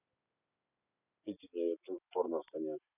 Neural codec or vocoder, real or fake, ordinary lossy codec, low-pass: none; real; none; 3.6 kHz